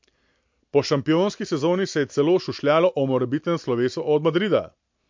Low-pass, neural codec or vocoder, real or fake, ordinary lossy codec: 7.2 kHz; none; real; MP3, 48 kbps